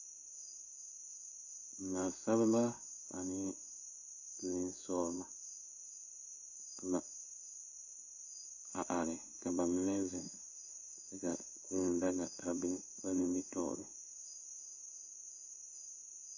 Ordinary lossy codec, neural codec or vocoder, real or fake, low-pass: AAC, 48 kbps; codec, 16 kHz in and 24 kHz out, 1 kbps, XY-Tokenizer; fake; 7.2 kHz